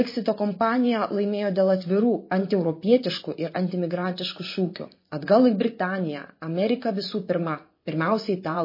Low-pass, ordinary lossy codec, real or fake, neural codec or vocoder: 5.4 kHz; MP3, 24 kbps; fake; vocoder, 24 kHz, 100 mel bands, Vocos